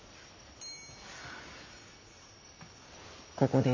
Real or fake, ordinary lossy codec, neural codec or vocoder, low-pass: real; AAC, 32 kbps; none; 7.2 kHz